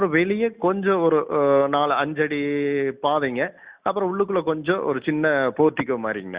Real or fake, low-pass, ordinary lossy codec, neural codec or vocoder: real; 3.6 kHz; Opus, 64 kbps; none